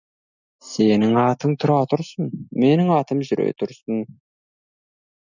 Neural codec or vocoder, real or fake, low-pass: none; real; 7.2 kHz